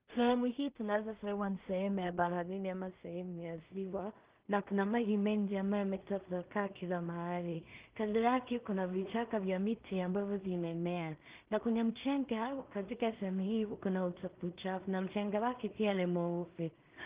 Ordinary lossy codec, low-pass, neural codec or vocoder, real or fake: Opus, 32 kbps; 3.6 kHz; codec, 16 kHz in and 24 kHz out, 0.4 kbps, LongCat-Audio-Codec, two codebook decoder; fake